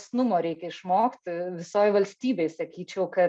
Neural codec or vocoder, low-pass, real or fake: none; 9.9 kHz; real